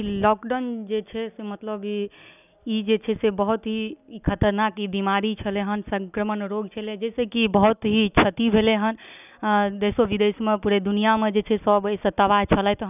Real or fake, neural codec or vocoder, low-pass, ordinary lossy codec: real; none; 3.6 kHz; none